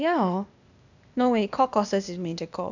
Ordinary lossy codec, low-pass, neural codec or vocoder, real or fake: none; 7.2 kHz; codec, 16 kHz, 0.8 kbps, ZipCodec; fake